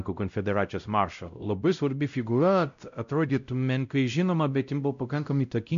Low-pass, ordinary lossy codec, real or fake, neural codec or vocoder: 7.2 kHz; MP3, 96 kbps; fake; codec, 16 kHz, 0.5 kbps, X-Codec, WavLM features, trained on Multilingual LibriSpeech